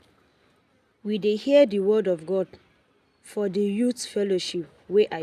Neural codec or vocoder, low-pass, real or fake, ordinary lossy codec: none; 14.4 kHz; real; none